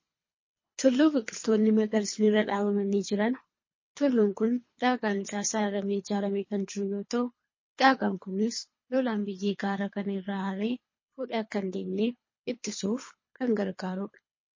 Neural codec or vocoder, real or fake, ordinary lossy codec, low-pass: codec, 24 kHz, 3 kbps, HILCodec; fake; MP3, 32 kbps; 7.2 kHz